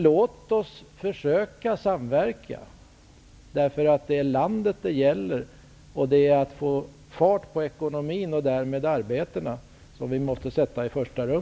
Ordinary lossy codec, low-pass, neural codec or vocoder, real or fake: none; none; none; real